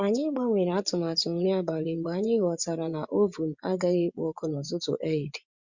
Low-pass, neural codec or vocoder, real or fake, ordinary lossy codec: 7.2 kHz; vocoder, 24 kHz, 100 mel bands, Vocos; fake; Opus, 64 kbps